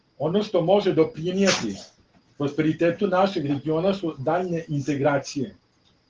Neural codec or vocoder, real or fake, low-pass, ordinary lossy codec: none; real; 7.2 kHz; Opus, 16 kbps